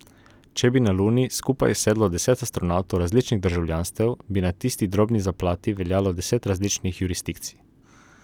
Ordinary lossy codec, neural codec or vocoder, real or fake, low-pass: none; none; real; 19.8 kHz